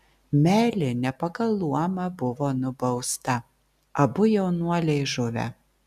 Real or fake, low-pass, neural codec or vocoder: real; 14.4 kHz; none